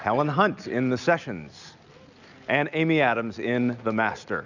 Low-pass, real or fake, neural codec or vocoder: 7.2 kHz; real; none